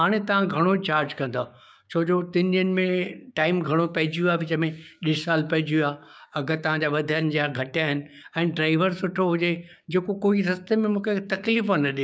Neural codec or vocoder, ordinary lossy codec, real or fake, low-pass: codec, 16 kHz, 6 kbps, DAC; none; fake; none